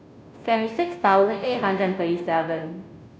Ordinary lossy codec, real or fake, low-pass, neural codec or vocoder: none; fake; none; codec, 16 kHz, 0.5 kbps, FunCodec, trained on Chinese and English, 25 frames a second